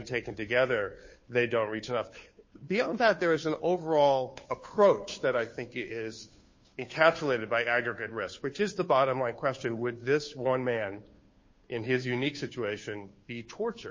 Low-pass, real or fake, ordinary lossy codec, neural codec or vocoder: 7.2 kHz; fake; MP3, 32 kbps; codec, 16 kHz, 2 kbps, FunCodec, trained on Chinese and English, 25 frames a second